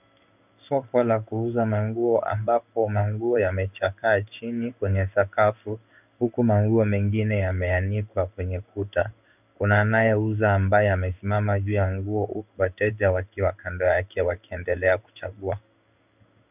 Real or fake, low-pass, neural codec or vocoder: fake; 3.6 kHz; codec, 16 kHz in and 24 kHz out, 1 kbps, XY-Tokenizer